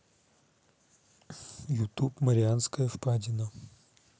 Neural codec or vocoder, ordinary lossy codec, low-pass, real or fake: none; none; none; real